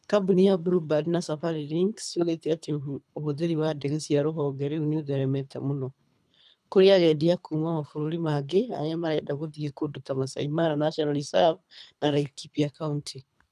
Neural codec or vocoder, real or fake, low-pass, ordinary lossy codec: codec, 24 kHz, 3 kbps, HILCodec; fake; none; none